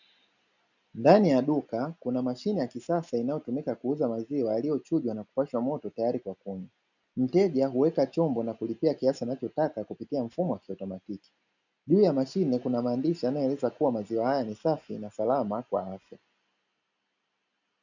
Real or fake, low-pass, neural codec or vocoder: real; 7.2 kHz; none